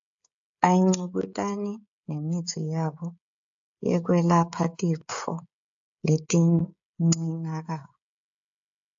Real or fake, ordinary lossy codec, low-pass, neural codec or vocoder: fake; AAC, 64 kbps; 7.2 kHz; codec, 16 kHz, 16 kbps, FreqCodec, smaller model